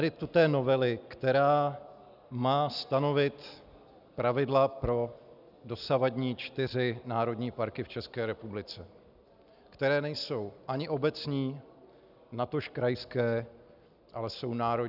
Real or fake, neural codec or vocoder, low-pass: real; none; 5.4 kHz